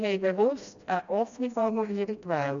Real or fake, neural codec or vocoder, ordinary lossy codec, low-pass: fake; codec, 16 kHz, 1 kbps, FreqCodec, smaller model; AAC, 64 kbps; 7.2 kHz